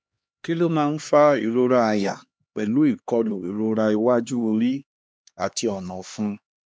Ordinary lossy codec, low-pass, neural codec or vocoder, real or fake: none; none; codec, 16 kHz, 2 kbps, X-Codec, HuBERT features, trained on LibriSpeech; fake